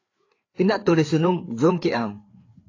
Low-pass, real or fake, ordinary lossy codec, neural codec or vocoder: 7.2 kHz; fake; AAC, 32 kbps; codec, 16 kHz, 4 kbps, FreqCodec, larger model